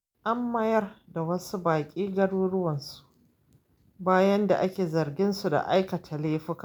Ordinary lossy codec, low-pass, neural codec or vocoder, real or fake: none; none; none; real